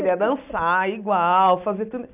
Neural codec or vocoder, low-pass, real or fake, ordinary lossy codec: none; 3.6 kHz; real; none